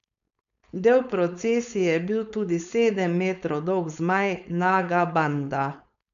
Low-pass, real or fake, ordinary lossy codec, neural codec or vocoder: 7.2 kHz; fake; none; codec, 16 kHz, 4.8 kbps, FACodec